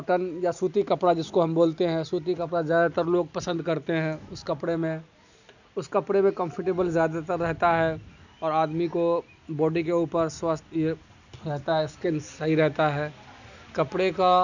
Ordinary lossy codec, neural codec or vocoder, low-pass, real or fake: none; none; 7.2 kHz; real